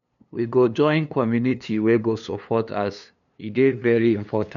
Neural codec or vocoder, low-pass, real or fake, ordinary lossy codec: codec, 16 kHz, 2 kbps, FunCodec, trained on LibriTTS, 25 frames a second; 7.2 kHz; fake; none